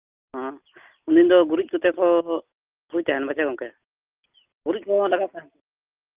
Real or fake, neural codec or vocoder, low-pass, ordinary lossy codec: real; none; 3.6 kHz; Opus, 32 kbps